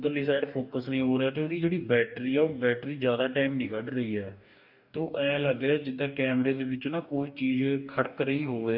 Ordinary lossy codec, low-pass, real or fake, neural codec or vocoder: none; 5.4 kHz; fake; codec, 44.1 kHz, 2.6 kbps, DAC